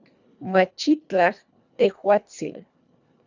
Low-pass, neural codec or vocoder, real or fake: 7.2 kHz; codec, 24 kHz, 1.5 kbps, HILCodec; fake